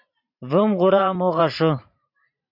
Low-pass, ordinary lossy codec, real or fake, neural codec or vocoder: 5.4 kHz; MP3, 48 kbps; fake; vocoder, 44.1 kHz, 128 mel bands every 512 samples, BigVGAN v2